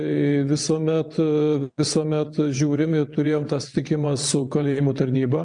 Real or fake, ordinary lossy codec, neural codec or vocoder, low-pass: fake; MP3, 96 kbps; vocoder, 22.05 kHz, 80 mel bands, WaveNeXt; 9.9 kHz